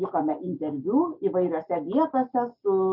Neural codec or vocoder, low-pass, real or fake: none; 5.4 kHz; real